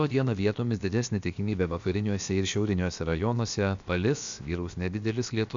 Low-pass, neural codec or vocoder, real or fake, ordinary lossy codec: 7.2 kHz; codec, 16 kHz, about 1 kbps, DyCAST, with the encoder's durations; fake; MP3, 64 kbps